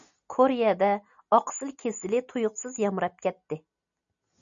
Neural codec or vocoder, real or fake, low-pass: none; real; 7.2 kHz